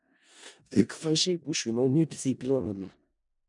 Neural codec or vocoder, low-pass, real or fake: codec, 16 kHz in and 24 kHz out, 0.4 kbps, LongCat-Audio-Codec, four codebook decoder; 10.8 kHz; fake